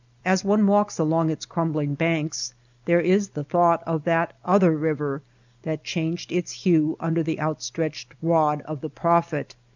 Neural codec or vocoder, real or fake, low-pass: none; real; 7.2 kHz